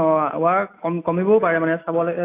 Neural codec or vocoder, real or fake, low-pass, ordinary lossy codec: none; real; 3.6 kHz; AAC, 24 kbps